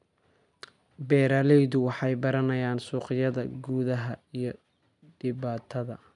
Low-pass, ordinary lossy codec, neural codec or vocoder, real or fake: 10.8 kHz; none; none; real